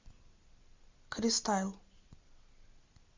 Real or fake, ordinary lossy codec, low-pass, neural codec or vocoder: real; MP3, 64 kbps; 7.2 kHz; none